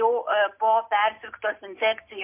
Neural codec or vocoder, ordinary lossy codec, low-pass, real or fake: none; MP3, 32 kbps; 3.6 kHz; real